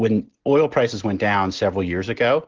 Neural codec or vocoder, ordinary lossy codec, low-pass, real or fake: none; Opus, 16 kbps; 7.2 kHz; real